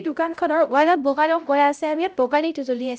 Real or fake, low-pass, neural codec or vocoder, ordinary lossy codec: fake; none; codec, 16 kHz, 0.5 kbps, X-Codec, HuBERT features, trained on LibriSpeech; none